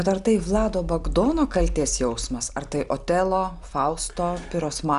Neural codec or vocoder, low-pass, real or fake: none; 10.8 kHz; real